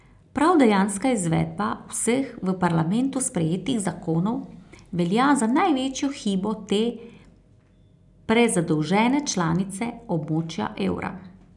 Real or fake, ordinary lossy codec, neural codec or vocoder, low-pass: real; none; none; 10.8 kHz